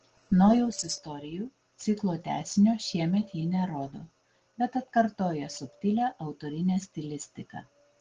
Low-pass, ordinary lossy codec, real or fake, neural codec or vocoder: 7.2 kHz; Opus, 16 kbps; real; none